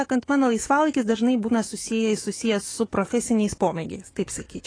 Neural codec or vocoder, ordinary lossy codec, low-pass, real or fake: autoencoder, 48 kHz, 128 numbers a frame, DAC-VAE, trained on Japanese speech; AAC, 32 kbps; 9.9 kHz; fake